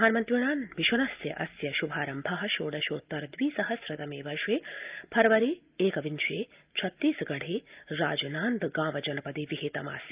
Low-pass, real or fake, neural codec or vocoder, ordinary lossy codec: 3.6 kHz; real; none; Opus, 24 kbps